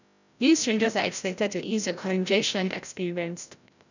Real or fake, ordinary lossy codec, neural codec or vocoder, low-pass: fake; none; codec, 16 kHz, 0.5 kbps, FreqCodec, larger model; 7.2 kHz